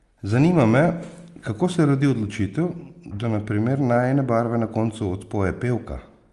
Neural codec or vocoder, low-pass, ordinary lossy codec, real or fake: none; 10.8 kHz; Opus, 32 kbps; real